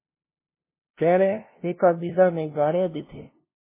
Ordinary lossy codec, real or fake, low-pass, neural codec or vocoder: MP3, 16 kbps; fake; 3.6 kHz; codec, 16 kHz, 0.5 kbps, FunCodec, trained on LibriTTS, 25 frames a second